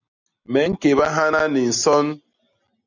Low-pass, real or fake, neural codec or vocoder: 7.2 kHz; real; none